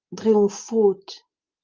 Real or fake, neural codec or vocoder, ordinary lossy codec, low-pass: real; none; Opus, 32 kbps; 7.2 kHz